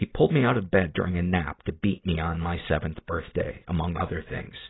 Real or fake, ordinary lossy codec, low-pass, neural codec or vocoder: real; AAC, 16 kbps; 7.2 kHz; none